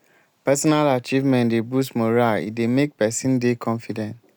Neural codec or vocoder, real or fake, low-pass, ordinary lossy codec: none; real; none; none